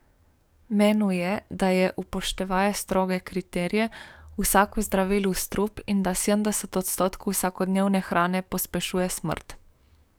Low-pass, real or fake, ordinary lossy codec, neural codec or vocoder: none; fake; none; codec, 44.1 kHz, 7.8 kbps, DAC